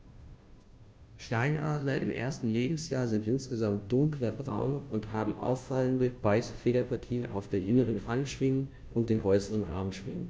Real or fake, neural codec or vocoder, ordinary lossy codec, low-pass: fake; codec, 16 kHz, 0.5 kbps, FunCodec, trained on Chinese and English, 25 frames a second; none; none